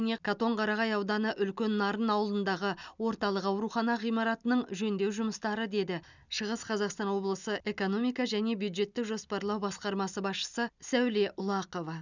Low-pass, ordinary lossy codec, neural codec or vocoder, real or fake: 7.2 kHz; none; none; real